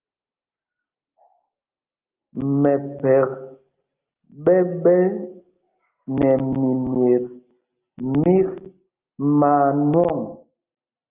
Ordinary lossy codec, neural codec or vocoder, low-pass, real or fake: Opus, 32 kbps; none; 3.6 kHz; real